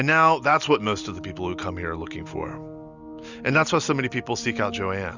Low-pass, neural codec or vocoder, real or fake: 7.2 kHz; none; real